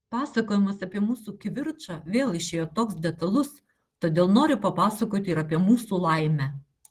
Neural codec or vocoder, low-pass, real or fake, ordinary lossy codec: none; 14.4 kHz; real; Opus, 16 kbps